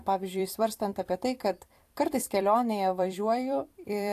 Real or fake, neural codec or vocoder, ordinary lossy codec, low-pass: fake; vocoder, 44.1 kHz, 128 mel bands every 256 samples, BigVGAN v2; AAC, 64 kbps; 14.4 kHz